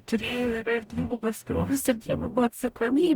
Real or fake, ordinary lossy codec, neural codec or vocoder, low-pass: fake; Opus, 64 kbps; codec, 44.1 kHz, 0.9 kbps, DAC; 19.8 kHz